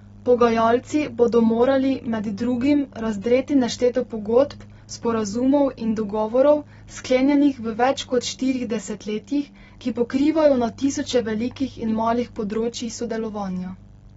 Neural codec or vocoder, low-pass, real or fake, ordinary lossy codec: none; 19.8 kHz; real; AAC, 24 kbps